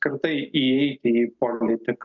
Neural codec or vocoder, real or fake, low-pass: none; real; 7.2 kHz